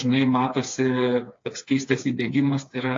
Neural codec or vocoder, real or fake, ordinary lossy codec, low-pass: codec, 16 kHz, 4 kbps, FreqCodec, smaller model; fake; AAC, 48 kbps; 7.2 kHz